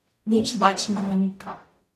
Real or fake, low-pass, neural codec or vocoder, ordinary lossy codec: fake; 14.4 kHz; codec, 44.1 kHz, 0.9 kbps, DAC; AAC, 64 kbps